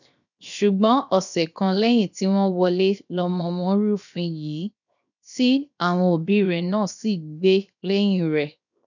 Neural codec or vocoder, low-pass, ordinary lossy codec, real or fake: codec, 16 kHz, 0.7 kbps, FocalCodec; 7.2 kHz; none; fake